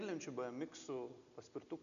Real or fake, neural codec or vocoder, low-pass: real; none; 7.2 kHz